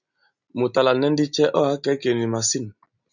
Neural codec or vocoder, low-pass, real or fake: none; 7.2 kHz; real